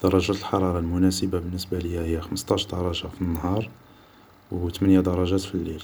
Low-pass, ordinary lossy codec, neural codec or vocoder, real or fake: none; none; none; real